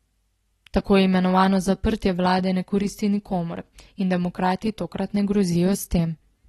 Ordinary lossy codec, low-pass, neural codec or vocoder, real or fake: AAC, 32 kbps; 19.8 kHz; none; real